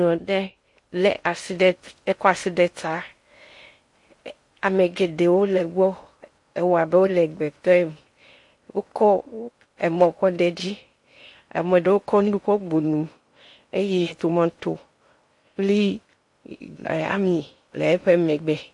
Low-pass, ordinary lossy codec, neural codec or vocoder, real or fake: 10.8 kHz; MP3, 48 kbps; codec, 16 kHz in and 24 kHz out, 0.6 kbps, FocalCodec, streaming, 2048 codes; fake